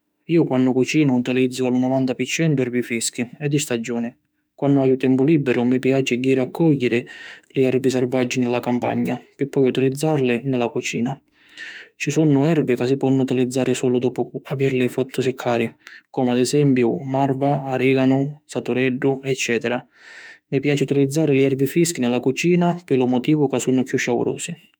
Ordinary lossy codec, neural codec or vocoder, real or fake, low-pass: none; autoencoder, 48 kHz, 32 numbers a frame, DAC-VAE, trained on Japanese speech; fake; none